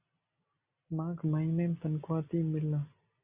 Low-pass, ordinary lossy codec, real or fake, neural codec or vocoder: 3.6 kHz; Opus, 64 kbps; real; none